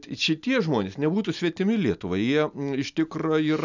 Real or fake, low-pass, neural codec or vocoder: real; 7.2 kHz; none